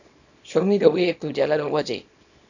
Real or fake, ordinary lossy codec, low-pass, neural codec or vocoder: fake; none; 7.2 kHz; codec, 24 kHz, 0.9 kbps, WavTokenizer, small release